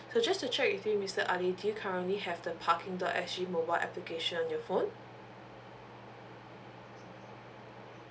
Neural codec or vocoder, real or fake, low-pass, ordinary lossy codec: none; real; none; none